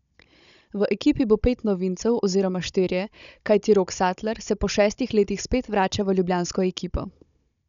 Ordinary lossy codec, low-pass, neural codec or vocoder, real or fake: none; 7.2 kHz; codec, 16 kHz, 16 kbps, FunCodec, trained on Chinese and English, 50 frames a second; fake